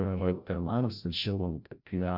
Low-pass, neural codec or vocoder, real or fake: 5.4 kHz; codec, 16 kHz, 0.5 kbps, FreqCodec, larger model; fake